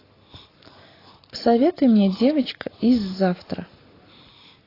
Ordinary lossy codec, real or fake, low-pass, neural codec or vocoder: AAC, 24 kbps; real; 5.4 kHz; none